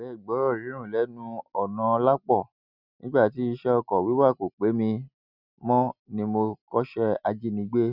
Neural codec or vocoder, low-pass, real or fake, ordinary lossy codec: none; 5.4 kHz; real; none